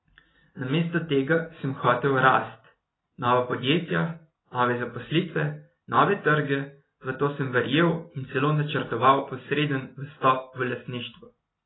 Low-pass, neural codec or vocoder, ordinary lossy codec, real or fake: 7.2 kHz; none; AAC, 16 kbps; real